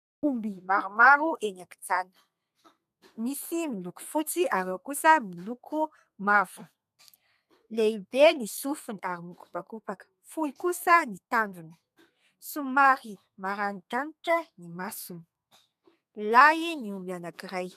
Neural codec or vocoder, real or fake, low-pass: codec, 32 kHz, 1.9 kbps, SNAC; fake; 14.4 kHz